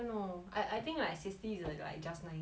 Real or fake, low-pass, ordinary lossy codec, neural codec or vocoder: real; none; none; none